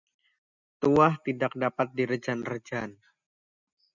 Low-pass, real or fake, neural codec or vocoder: 7.2 kHz; real; none